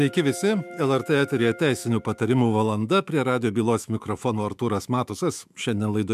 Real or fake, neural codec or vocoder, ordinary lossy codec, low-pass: fake; autoencoder, 48 kHz, 128 numbers a frame, DAC-VAE, trained on Japanese speech; MP3, 96 kbps; 14.4 kHz